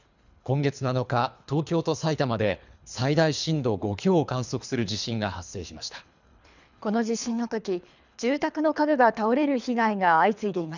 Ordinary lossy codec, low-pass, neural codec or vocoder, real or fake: none; 7.2 kHz; codec, 24 kHz, 3 kbps, HILCodec; fake